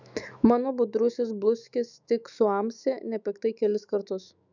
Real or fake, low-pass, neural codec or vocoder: fake; 7.2 kHz; autoencoder, 48 kHz, 128 numbers a frame, DAC-VAE, trained on Japanese speech